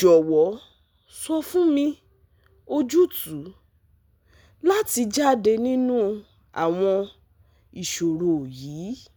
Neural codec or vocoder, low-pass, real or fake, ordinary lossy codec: none; none; real; none